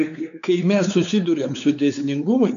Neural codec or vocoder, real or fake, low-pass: codec, 16 kHz, 4 kbps, X-Codec, WavLM features, trained on Multilingual LibriSpeech; fake; 7.2 kHz